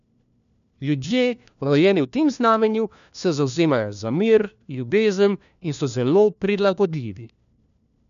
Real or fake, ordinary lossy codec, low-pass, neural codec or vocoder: fake; none; 7.2 kHz; codec, 16 kHz, 1 kbps, FunCodec, trained on LibriTTS, 50 frames a second